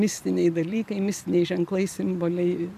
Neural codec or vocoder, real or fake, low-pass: vocoder, 44.1 kHz, 128 mel bands every 512 samples, BigVGAN v2; fake; 14.4 kHz